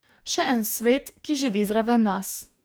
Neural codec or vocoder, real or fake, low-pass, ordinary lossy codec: codec, 44.1 kHz, 2.6 kbps, DAC; fake; none; none